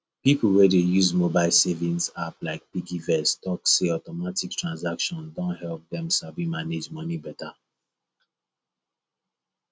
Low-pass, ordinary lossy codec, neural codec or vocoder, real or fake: none; none; none; real